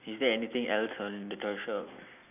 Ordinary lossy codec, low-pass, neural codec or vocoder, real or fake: none; 3.6 kHz; none; real